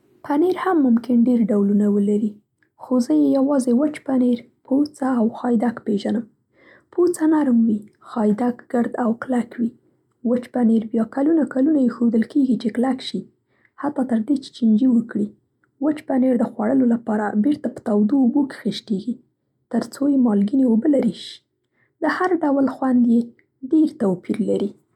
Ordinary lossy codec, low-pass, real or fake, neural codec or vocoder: none; 19.8 kHz; real; none